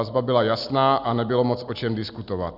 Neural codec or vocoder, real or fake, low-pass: none; real; 5.4 kHz